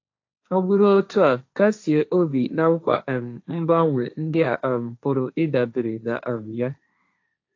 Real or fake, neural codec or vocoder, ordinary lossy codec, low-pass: fake; codec, 16 kHz, 1.1 kbps, Voila-Tokenizer; AAC, 48 kbps; 7.2 kHz